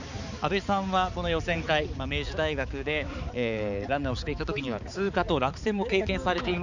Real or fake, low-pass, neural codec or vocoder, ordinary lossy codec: fake; 7.2 kHz; codec, 16 kHz, 4 kbps, X-Codec, HuBERT features, trained on balanced general audio; none